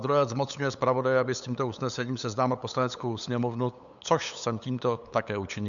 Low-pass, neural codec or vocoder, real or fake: 7.2 kHz; codec, 16 kHz, 8 kbps, FunCodec, trained on LibriTTS, 25 frames a second; fake